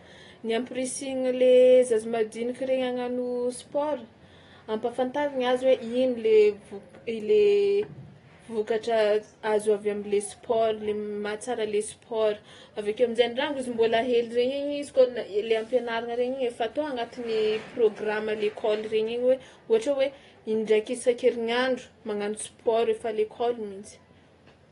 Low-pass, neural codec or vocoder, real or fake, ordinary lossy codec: 10.8 kHz; none; real; AAC, 32 kbps